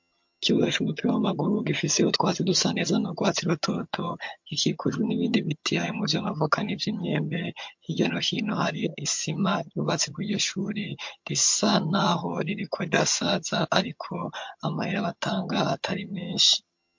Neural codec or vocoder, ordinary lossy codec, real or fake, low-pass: vocoder, 22.05 kHz, 80 mel bands, HiFi-GAN; MP3, 48 kbps; fake; 7.2 kHz